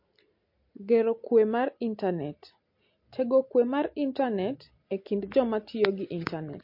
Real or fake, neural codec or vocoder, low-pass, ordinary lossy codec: real; none; 5.4 kHz; MP3, 32 kbps